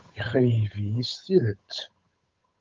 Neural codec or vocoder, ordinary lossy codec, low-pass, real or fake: codec, 16 kHz, 8 kbps, FunCodec, trained on Chinese and English, 25 frames a second; Opus, 32 kbps; 7.2 kHz; fake